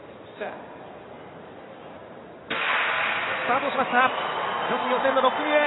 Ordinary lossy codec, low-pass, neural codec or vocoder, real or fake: AAC, 16 kbps; 7.2 kHz; none; real